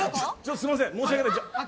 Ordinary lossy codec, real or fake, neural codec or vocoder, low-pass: none; real; none; none